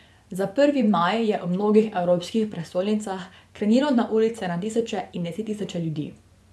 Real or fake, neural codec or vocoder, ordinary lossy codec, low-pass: fake; vocoder, 24 kHz, 100 mel bands, Vocos; none; none